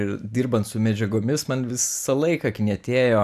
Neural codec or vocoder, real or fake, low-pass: none; real; 14.4 kHz